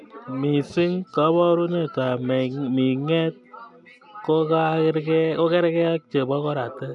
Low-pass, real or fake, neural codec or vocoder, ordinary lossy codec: 10.8 kHz; real; none; none